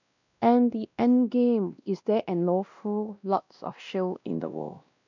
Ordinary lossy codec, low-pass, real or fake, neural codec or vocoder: none; 7.2 kHz; fake; codec, 16 kHz, 1 kbps, X-Codec, WavLM features, trained on Multilingual LibriSpeech